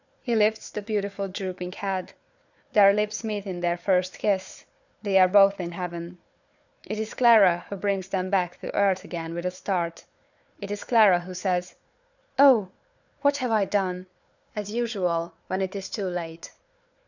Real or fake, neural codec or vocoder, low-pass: fake; codec, 16 kHz, 4 kbps, FunCodec, trained on Chinese and English, 50 frames a second; 7.2 kHz